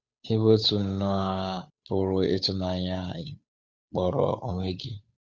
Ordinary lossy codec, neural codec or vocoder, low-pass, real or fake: none; codec, 16 kHz, 8 kbps, FunCodec, trained on Chinese and English, 25 frames a second; none; fake